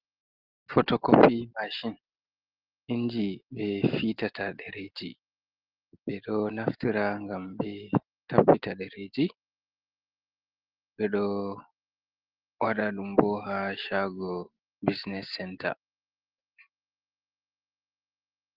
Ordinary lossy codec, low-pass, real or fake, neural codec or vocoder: Opus, 16 kbps; 5.4 kHz; real; none